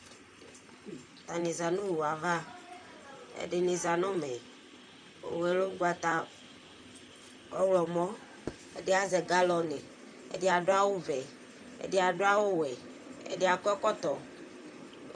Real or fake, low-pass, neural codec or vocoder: fake; 9.9 kHz; vocoder, 44.1 kHz, 128 mel bands, Pupu-Vocoder